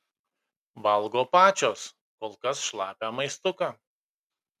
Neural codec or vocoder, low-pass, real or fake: none; 14.4 kHz; real